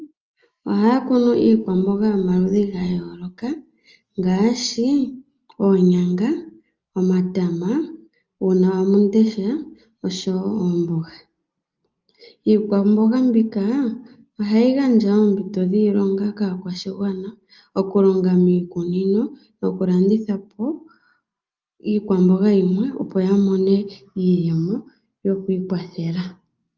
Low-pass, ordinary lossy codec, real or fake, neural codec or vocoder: 7.2 kHz; Opus, 24 kbps; real; none